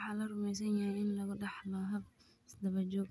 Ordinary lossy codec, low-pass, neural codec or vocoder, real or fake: none; none; none; real